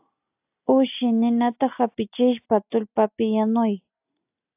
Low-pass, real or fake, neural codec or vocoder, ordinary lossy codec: 3.6 kHz; real; none; AAC, 32 kbps